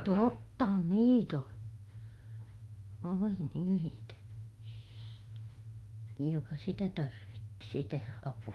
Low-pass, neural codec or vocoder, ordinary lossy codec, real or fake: 19.8 kHz; autoencoder, 48 kHz, 32 numbers a frame, DAC-VAE, trained on Japanese speech; Opus, 32 kbps; fake